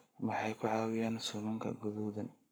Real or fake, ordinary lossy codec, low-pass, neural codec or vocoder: fake; none; none; codec, 44.1 kHz, 7.8 kbps, Pupu-Codec